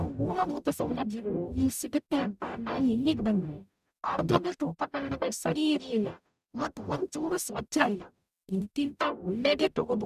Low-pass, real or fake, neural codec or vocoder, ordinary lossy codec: 14.4 kHz; fake; codec, 44.1 kHz, 0.9 kbps, DAC; none